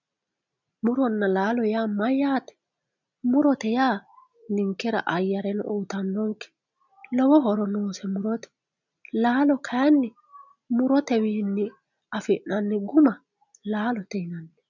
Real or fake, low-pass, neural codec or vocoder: real; 7.2 kHz; none